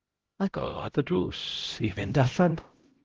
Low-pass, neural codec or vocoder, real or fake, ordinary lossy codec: 7.2 kHz; codec, 16 kHz, 0.5 kbps, X-Codec, HuBERT features, trained on LibriSpeech; fake; Opus, 16 kbps